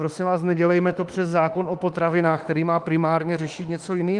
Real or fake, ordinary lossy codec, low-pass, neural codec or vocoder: fake; Opus, 32 kbps; 10.8 kHz; autoencoder, 48 kHz, 32 numbers a frame, DAC-VAE, trained on Japanese speech